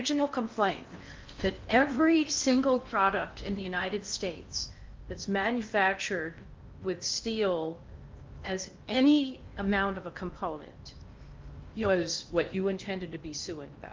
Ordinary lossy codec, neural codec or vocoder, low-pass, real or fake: Opus, 24 kbps; codec, 16 kHz in and 24 kHz out, 0.6 kbps, FocalCodec, streaming, 4096 codes; 7.2 kHz; fake